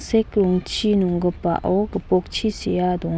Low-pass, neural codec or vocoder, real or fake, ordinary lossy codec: none; none; real; none